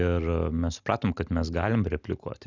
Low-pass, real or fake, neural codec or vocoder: 7.2 kHz; real; none